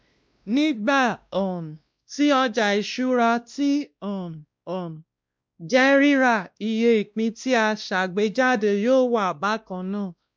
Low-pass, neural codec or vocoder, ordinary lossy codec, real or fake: none; codec, 16 kHz, 1 kbps, X-Codec, WavLM features, trained on Multilingual LibriSpeech; none; fake